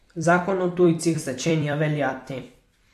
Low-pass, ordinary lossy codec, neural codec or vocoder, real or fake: 14.4 kHz; AAC, 64 kbps; vocoder, 44.1 kHz, 128 mel bands every 256 samples, BigVGAN v2; fake